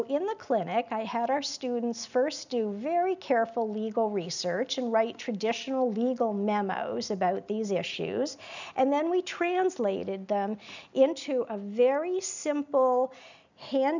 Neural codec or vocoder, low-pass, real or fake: none; 7.2 kHz; real